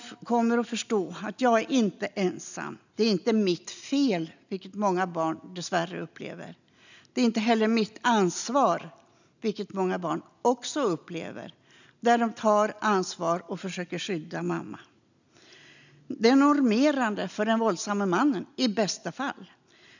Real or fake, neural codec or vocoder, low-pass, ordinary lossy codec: real; none; 7.2 kHz; none